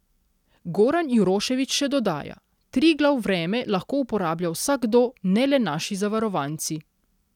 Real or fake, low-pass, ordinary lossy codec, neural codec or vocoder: real; 19.8 kHz; none; none